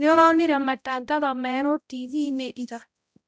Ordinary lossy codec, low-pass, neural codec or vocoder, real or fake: none; none; codec, 16 kHz, 0.5 kbps, X-Codec, HuBERT features, trained on balanced general audio; fake